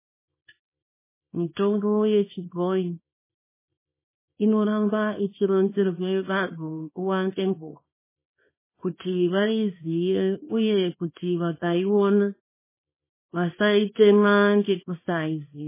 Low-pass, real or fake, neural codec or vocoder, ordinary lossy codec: 3.6 kHz; fake; codec, 24 kHz, 0.9 kbps, WavTokenizer, small release; MP3, 16 kbps